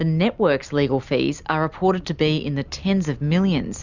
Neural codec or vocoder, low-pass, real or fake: none; 7.2 kHz; real